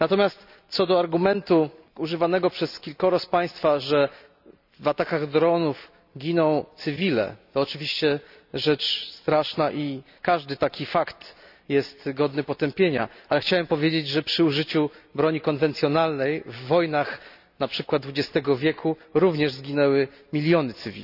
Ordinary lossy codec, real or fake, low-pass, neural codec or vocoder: none; real; 5.4 kHz; none